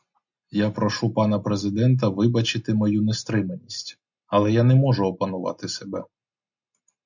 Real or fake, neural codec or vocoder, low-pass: real; none; 7.2 kHz